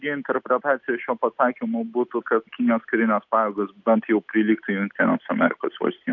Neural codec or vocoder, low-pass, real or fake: none; 7.2 kHz; real